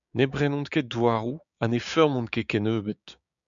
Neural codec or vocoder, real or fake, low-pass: codec, 16 kHz, 6 kbps, DAC; fake; 7.2 kHz